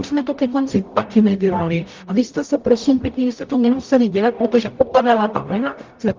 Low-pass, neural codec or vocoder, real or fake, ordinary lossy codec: 7.2 kHz; codec, 44.1 kHz, 0.9 kbps, DAC; fake; Opus, 24 kbps